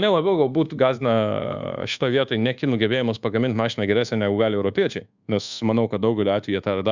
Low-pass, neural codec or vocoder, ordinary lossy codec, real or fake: 7.2 kHz; codec, 24 kHz, 1.2 kbps, DualCodec; Opus, 64 kbps; fake